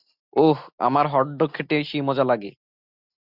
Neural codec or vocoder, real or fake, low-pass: none; real; 5.4 kHz